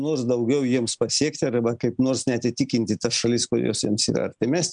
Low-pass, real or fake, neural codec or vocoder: 9.9 kHz; real; none